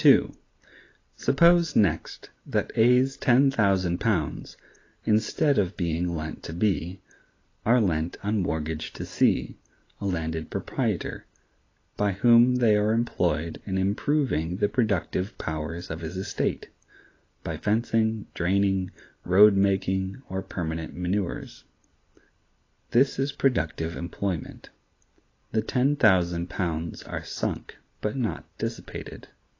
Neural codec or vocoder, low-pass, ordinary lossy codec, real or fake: none; 7.2 kHz; AAC, 32 kbps; real